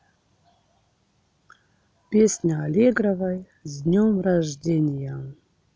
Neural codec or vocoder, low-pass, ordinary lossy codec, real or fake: none; none; none; real